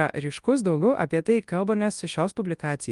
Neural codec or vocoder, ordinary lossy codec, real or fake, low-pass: codec, 24 kHz, 0.9 kbps, WavTokenizer, large speech release; Opus, 32 kbps; fake; 10.8 kHz